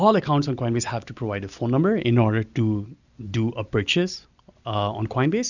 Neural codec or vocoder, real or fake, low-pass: none; real; 7.2 kHz